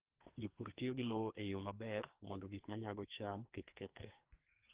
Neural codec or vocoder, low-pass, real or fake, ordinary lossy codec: codec, 44.1 kHz, 2.6 kbps, SNAC; 3.6 kHz; fake; Opus, 16 kbps